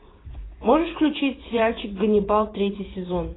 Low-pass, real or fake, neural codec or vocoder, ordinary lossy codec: 7.2 kHz; fake; vocoder, 24 kHz, 100 mel bands, Vocos; AAC, 16 kbps